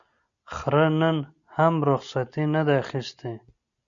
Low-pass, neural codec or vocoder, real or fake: 7.2 kHz; none; real